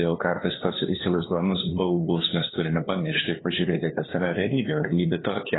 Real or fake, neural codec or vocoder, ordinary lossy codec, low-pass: fake; codec, 16 kHz, 2 kbps, FunCodec, trained on LibriTTS, 25 frames a second; AAC, 16 kbps; 7.2 kHz